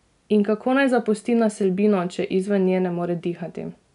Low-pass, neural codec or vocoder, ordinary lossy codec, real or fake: 10.8 kHz; none; none; real